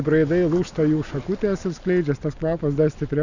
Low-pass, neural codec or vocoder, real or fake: 7.2 kHz; none; real